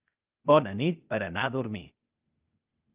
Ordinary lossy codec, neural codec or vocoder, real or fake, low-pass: Opus, 24 kbps; codec, 16 kHz, 0.8 kbps, ZipCodec; fake; 3.6 kHz